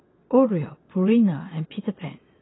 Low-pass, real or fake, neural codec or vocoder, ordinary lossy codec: 7.2 kHz; fake; vocoder, 22.05 kHz, 80 mel bands, WaveNeXt; AAC, 16 kbps